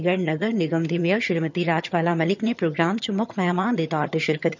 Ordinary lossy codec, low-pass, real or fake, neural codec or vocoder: none; 7.2 kHz; fake; vocoder, 22.05 kHz, 80 mel bands, HiFi-GAN